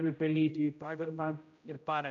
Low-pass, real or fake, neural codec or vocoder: 7.2 kHz; fake; codec, 16 kHz, 0.5 kbps, X-Codec, HuBERT features, trained on general audio